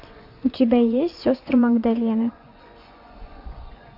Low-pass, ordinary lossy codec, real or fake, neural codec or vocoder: 5.4 kHz; MP3, 32 kbps; fake; vocoder, 24 kHz, 100 mel bands, Vocos